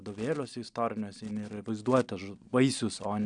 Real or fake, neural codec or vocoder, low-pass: real; none; 9.9 kHz